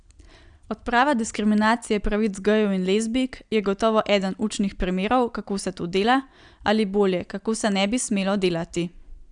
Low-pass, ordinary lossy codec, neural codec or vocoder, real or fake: 9.9 kHz; Opus, 64 kbps; none; real